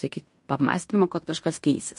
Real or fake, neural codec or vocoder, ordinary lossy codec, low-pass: fake; codec, 16 kHz in and 24 kHz out, 0.9 kbps, LongCat-Audio-Codec, fine tuned four codebook decoder; MP3, 48 kbps; 10.8 kHz